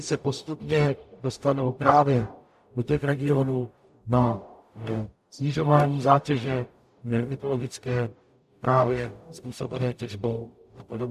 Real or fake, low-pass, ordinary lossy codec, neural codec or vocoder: fake; 14.4 kHz; AAC, 96 kbps; codec, 44.1 kHz, 0.9 kbps, DAC